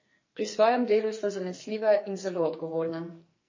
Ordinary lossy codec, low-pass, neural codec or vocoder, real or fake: MP3, 32 kbps; 7.2 kHz; codec, 44.1 kHz, 2.6 kbps, SNAC; fake